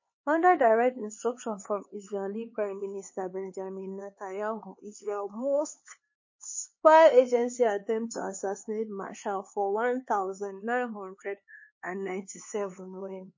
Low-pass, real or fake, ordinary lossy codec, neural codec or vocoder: 7.2 kHz; fake; MP3, 32 kbps; codec, 16 kHz, 4 kbps, X-Codec, HuBERT features, trained on LibriSpeech